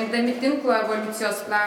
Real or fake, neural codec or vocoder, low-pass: real; none; 19.8 kHz